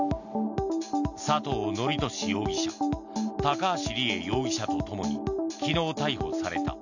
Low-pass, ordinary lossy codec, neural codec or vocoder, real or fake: 7.2 kHz; none; none; real